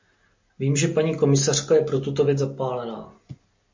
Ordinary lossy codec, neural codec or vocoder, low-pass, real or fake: MP3, 48 kbps; none; 7.2 kHz; real